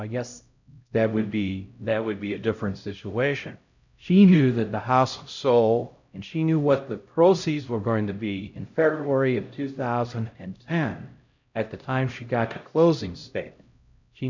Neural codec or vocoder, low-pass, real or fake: codec, 16 kHz, 0.5 kbps, X-Codec, HuBERT features, trained on LibriSpeech; 7.2 kHz; fake